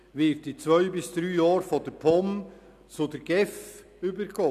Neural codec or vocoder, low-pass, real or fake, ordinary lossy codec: none; 14.4 kHz; real; none